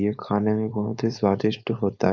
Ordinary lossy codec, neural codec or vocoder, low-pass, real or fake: Opus, 64 kbps; codec, 16 kHz, 4.8 kbps, FACodec; 7.2 kHz; fake